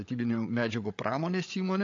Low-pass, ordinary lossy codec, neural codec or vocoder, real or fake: 7.2 kHz; AAC, 48 kbps; codec, 16 kHz, 8 kbps, FreqCodec, larger model; fake